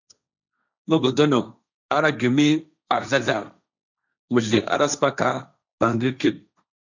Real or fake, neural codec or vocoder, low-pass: fake; codec, 16 kHz, 1.1 kbps, Voila-Tokenizer; 7.2 kHz